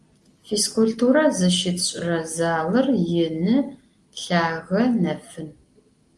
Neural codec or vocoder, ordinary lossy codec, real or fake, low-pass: none; Opus, 32 kbps; real; 10.8 kHz